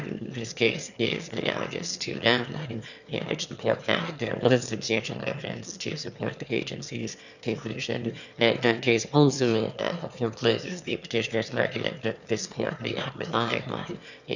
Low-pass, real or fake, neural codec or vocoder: 7.2 kHz; fake; autoencoder, 22.05 kHz, a latent of 192 numbers a frame, VITS, trained on one speaker